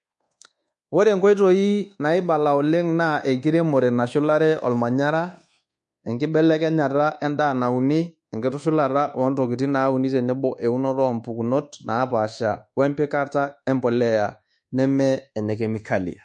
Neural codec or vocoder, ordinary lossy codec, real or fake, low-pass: codec, 24 kHz, 1.2 kbps, DualCodec; MP3, 48 kbps; fake; 10.8 kHz